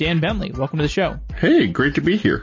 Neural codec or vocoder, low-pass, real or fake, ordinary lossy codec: none; 7.2 kHz; real; MP3, 32 kbps